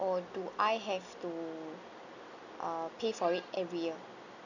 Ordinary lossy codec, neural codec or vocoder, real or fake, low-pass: none; none; real; 7.2 kHz